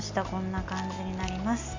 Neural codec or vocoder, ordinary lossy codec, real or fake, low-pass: none; MP3, 48 kbps; real; 7.2 kHz